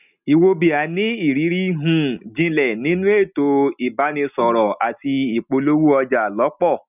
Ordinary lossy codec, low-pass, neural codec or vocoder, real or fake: none; 3.6 kHz; none; real